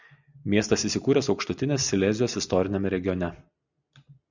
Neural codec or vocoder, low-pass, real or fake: none; 7.2 kHz; real